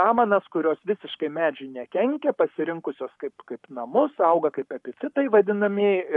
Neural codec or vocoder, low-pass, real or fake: codec, 44.1 kHz, 7.8 kbps, Pupu-Codec; 10.8 kHz; fake